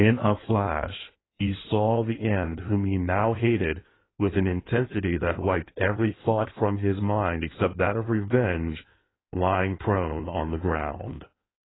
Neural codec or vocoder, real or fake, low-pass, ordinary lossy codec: codec, 16 kHz in and 24 kHz out, 1.1 kbps, FireRedTTS-2 codec; fake; 7.2 kHz; AAC, 16 kbps